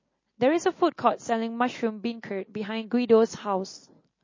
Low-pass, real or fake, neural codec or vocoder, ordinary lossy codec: 7.2 kHz; real; none; MP3, 32 kbps